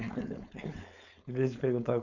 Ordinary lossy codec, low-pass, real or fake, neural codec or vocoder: none; 7.2 kHz; fake; codec, 16 kHz, 4.8 kbps, FACodec